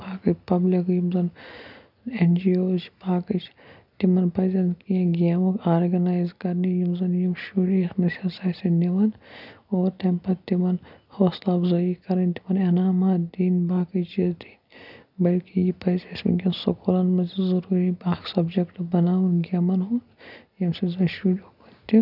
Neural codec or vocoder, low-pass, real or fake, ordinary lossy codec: none; 5.4 kHz; real; none